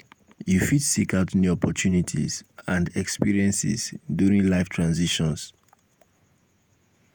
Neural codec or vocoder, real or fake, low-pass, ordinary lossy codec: vocoder, 48 kHz, 128 mel bands, Vocos; fake; none; none